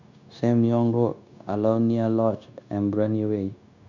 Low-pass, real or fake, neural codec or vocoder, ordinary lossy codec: 7.2 kHz; fake; codec, 16 kHz, 0.9 kbps, LongCat-Audio-Codec; none